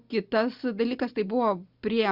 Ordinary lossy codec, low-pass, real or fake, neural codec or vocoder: Opus, 64 kbps; 5.4 kHz; real; none